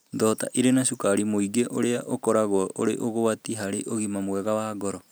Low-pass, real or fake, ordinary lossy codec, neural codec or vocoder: none; real; none; none